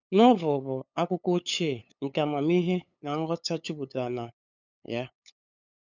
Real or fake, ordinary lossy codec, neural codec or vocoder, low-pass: fake; none; codec, 16 kHz, 8 kbps, FunCodec, trained on LibriTTS, 25 frames a second; 7.2 kHz